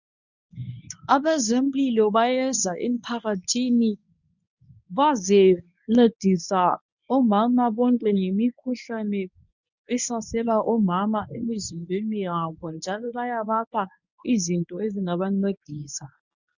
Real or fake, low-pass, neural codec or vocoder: fake; 7.2 kHz; codec, 24 kHz, 0.9 kbps, WavTokenizer, medium speech release version 1